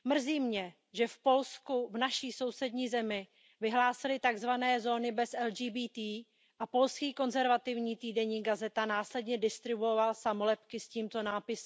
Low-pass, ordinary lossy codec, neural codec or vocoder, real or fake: none; none; none; real